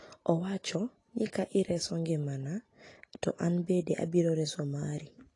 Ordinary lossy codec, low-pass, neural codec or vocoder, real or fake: AAC, 32 kbps; 10.8 kHz; none; real